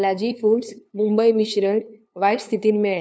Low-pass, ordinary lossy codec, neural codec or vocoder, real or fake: none; none; codec, 16 kHz, 2 kbps, FunCodec, trained on LibriTTS, 25 frames a second; fake